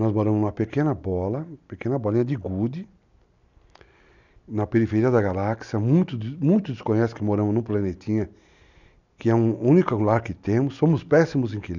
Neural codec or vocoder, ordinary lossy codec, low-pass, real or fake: none; none; 7.2 kHz; real